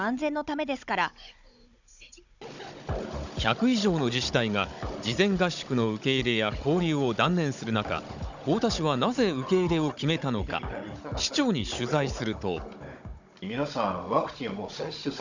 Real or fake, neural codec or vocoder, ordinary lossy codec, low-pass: fake; codec, 16 kHz, 16 kbps, FunCodec, trained on Chinese and English, 50 frames a second; none; 7.2 kHz